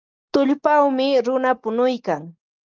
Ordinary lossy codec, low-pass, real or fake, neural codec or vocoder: Opus, 32 kbps; 7.2 kHz; real; none